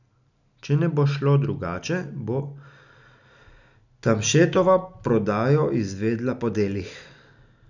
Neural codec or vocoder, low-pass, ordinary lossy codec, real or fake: none; 7.2 kHz; none; real